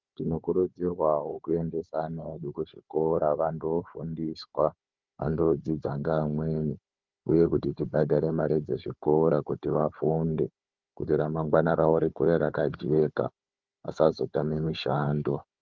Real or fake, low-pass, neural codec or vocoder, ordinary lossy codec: fake; 7.2 kHz; codec, 16 kHz, 4 kbps, FunCodec, trained on Chinese and English, 50 frames a second; Opus, 32 kbps